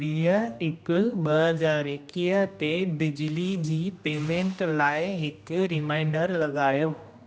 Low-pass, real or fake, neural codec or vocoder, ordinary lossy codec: none; fake; codec, 16 kHz, 1 kbps, X-Codec, HuBERT features, trained on general audio; none